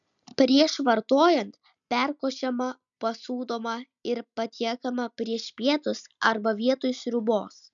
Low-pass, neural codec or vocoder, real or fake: 7.2 kHz; none; real